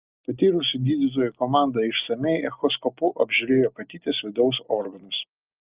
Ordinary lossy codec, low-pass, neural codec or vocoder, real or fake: Opus, 32 kbps; 3.6 kHz; none; real